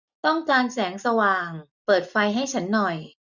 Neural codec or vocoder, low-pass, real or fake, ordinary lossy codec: none; 7.2 kHz; real; none